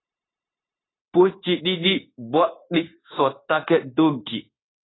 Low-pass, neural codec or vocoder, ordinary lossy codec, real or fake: 7.2 kHz; codec, 16 kHz, 0.9 kbps, LongCat-Audio-Codec; AAC, 16 kbps; fake